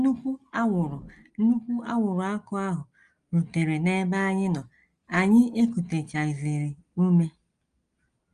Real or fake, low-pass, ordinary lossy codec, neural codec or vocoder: real; 9.9 kHz; Opus, 32 kbps; none